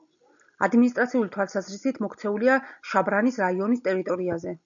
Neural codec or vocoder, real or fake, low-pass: none; real; 7.2 kHz